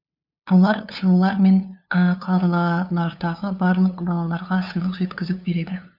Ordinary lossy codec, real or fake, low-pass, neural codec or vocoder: none; fake; 5.4 kHz; codec, 16 kHz, 2 kbps, FunCodec, trained on LibriTTS, 25 frames a second